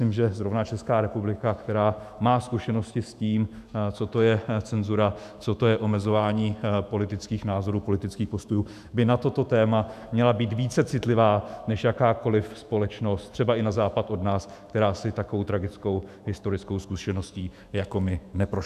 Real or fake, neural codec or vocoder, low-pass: fake; autoencoder, 48 kHz, 128 numbers a frame, DAC-VAE, trained on Japanese speech; 14.4 kHz